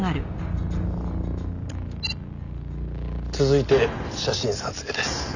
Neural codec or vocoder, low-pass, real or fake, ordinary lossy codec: none; 7.2 kHz; real; none